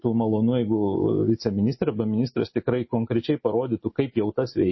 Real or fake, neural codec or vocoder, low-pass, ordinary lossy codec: real; none; 7.2 kHz; MP3, 24 kbps